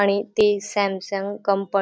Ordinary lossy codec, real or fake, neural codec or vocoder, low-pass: none; real; none; none